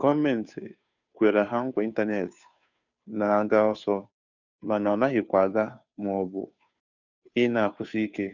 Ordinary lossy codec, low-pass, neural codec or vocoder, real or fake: none; 7.2 kHz; codec, 16 kHz, 2 kbps, FunCodec, trained on Chinese and English, 25 frames a second; fake